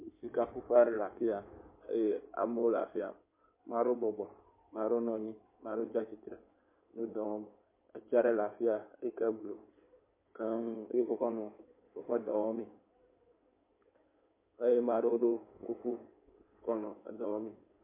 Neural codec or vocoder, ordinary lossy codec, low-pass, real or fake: codec, 16 kHz in and 24 kHz out, 2.2 kbps, FireRedTTS-2 codec; MP3, 24 kbps; 3.6 kHz; fake